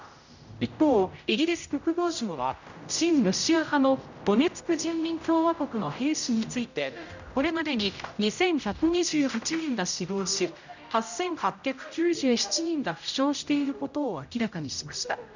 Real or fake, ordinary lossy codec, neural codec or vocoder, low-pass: fake; none; codec, 16 kHz, 0.5 kbps, X-Codec, HuBERT features, trained on general audio; 7.2 kHz